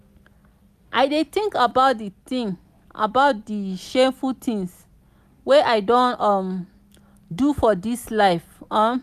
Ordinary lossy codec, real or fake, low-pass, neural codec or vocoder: none; real; 14.4 kHz; none